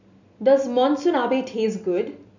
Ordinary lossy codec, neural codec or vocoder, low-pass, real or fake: none; none; 7.2 kHz; real